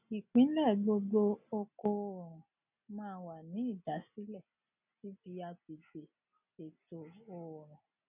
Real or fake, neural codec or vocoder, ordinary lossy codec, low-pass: real; none; none; 3.6 kHz